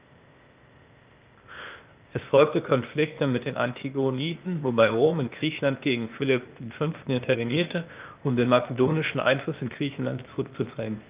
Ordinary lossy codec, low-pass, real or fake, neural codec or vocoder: Opus, 24 kbps; 3.6 kHz; fake; codec, 16 kHz, 0.8 kbps, ZipCodec